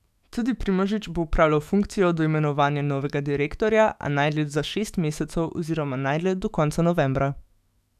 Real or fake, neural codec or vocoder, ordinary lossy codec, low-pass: fake; autoencoder, 48 kHz, 128 numbers a frame, DAC-VAE, trained on Japanese speech; none; 14.4 kHz